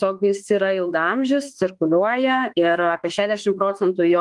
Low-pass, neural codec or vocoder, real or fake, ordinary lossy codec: 10.8 kHz; autoencoder, 48 kHz, 32 numbers a frame, DAC-VAE, trained on Japanese speech; fake; Opus, 24 kbps